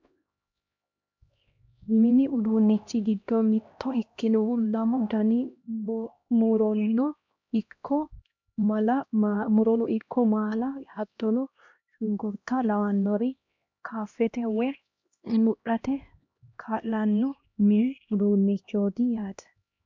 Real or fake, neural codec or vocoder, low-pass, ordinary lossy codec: fake; codec, 16 kHz, 1 kbps, X-Codec, HuBERT features, trained on LibriSpeech; 7.2 kHz; MP3, 64 kbps